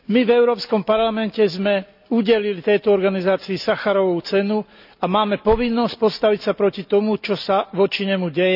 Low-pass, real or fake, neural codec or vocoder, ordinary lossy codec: 5.4 kHz; real; none; none